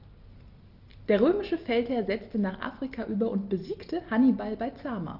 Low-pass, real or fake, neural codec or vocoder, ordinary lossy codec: 5.4 kHz; real; none; Opus, 32 kbps